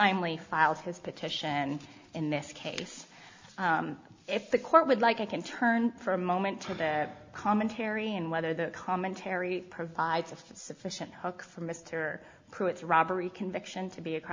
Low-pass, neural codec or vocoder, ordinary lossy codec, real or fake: 7.2 kHz; none; MP3, 64 kbps; real